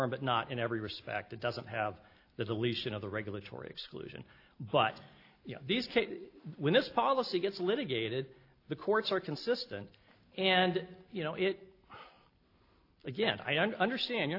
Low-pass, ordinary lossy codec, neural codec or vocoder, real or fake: 5.4 kHz; AAC, 32 kbps; none; real